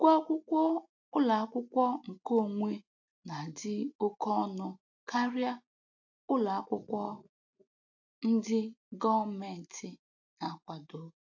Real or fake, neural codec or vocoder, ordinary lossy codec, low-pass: real; none; AAC, 48 kbps; 7.2 kHz